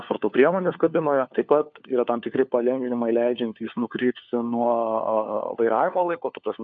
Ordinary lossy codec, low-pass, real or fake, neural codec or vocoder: AAC, 64 kbps; 7.2 kHz; fake; codec, 16 kHz, 4 kbps, FunCodec, trained on LibriTTS, 50 frames a second